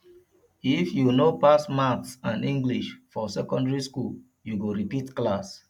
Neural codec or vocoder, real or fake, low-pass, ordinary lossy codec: none; real; 19.8 kHz; none